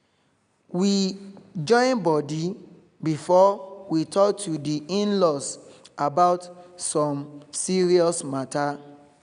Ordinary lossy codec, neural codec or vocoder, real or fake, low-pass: none; none; real; 9.9 kHz